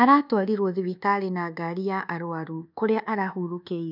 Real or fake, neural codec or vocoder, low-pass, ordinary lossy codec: fake; codec, 24 kHz, 1.2 kbps, DualCodec; 5.4 kHz; none